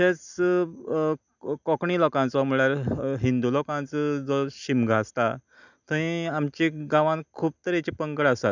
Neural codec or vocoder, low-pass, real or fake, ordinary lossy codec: none; 7.2 kHz; real; none